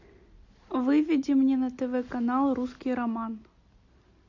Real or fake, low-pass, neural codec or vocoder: real; 7.2 kHz; none